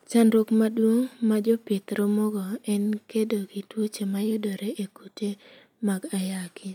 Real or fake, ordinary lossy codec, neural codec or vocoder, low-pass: real; none; none; 19.8 kHz